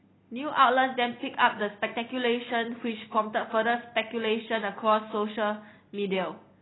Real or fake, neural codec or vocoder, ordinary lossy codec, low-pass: real; none; AAC, 16 kbps; 7.2 kHz